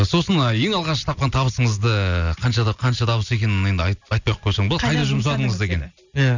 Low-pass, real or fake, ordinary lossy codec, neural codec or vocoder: 7.2 kHz; real; none; none